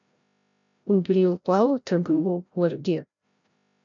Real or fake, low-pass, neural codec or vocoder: fake; 7.2 kHz; codec, 16 kHz, 0.5 kbps, FreqCodec, larger model